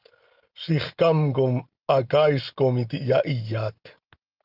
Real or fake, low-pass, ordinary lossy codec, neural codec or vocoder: real; 5.4 kHz; Opus, 32 kbps; none